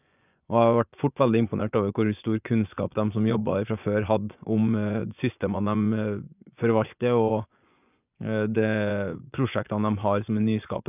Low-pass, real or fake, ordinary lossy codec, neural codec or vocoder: 3.6 kHz; fake; none; vocoder, 24 kHz, 100 mel bands, Vocos